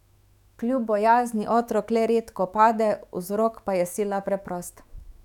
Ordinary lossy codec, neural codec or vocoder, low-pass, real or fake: none; autoencoder, 48 kHz, 128 numbers a frame, DAC-VAE, trained on Japanese speech; 19.8 kHz; fake